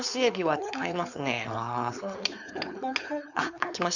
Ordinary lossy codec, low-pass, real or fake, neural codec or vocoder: none; 7.2 kHz; fake; codec, 16 kHz, 4.8 kbps, FACodec